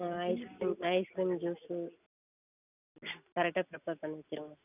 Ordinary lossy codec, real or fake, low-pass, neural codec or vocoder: none; real; 3.6 kHz; none